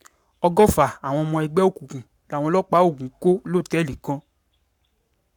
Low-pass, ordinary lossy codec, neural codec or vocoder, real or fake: 19.8 kHz; none; codec, 44.1 kHz, 7.8 kbps, Pupu-Codec; fake